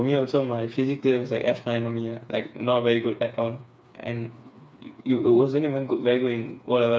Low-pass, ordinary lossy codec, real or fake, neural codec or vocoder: none; none; fake; codec, 16 kHz, 4 kbps, FreqCodec, smaller model